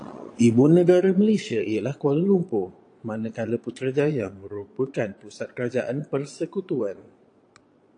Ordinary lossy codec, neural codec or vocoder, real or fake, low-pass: AAC, 48 kbps; vocoder, 22.05 kHz, 80 mel bands, Vocos; fake; 9.9 kHz